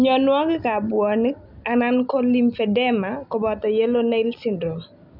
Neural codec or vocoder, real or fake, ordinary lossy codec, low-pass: none; real; none; 5.4 kHz